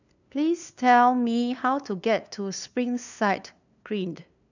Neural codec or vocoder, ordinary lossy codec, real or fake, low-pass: codec, 16 kHz, 2 kbps, FunCodec, trained on LibriTTS, 25 frames a second; none; fake; 7.2 kHz